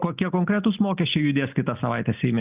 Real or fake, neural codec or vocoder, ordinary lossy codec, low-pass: real; none; Opus, 24 kbps; 3.6 kHz